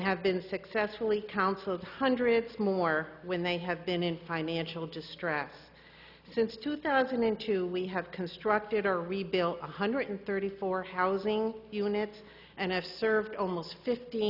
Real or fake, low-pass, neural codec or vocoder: real; 5.4 kHz; none